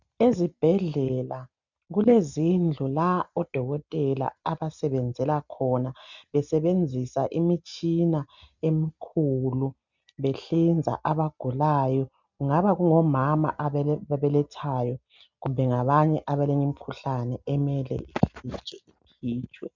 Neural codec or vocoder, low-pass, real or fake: none; 7.2 kHz; real